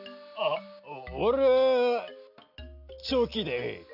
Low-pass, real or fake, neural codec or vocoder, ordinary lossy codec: 5.4 kHz; real; none; none